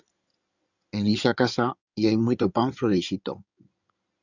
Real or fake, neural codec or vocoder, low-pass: fake; codec, 16 kHz in and 24 kHz out, 2.2 kbps, FireRedTTS-2 codec; 7.2 kHz